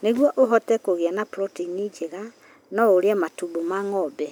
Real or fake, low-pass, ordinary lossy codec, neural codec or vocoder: real; none; none; none